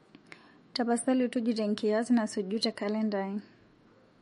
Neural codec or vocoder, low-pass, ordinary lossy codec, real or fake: autoencoder, 48 kHz, 128 numbers a frame, DAC-VAE, trained on Japanese speech; 19.8 kHz; MP3, 48 kbps; fake